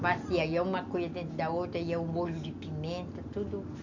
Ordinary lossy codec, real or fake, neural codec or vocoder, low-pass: none; real; none; 7.2 kHz